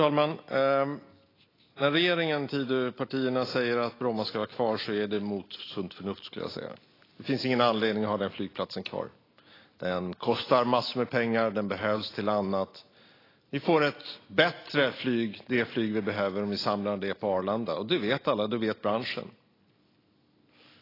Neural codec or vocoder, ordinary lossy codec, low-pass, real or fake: none; AAC, 24 kbps; 5.4 kHz; real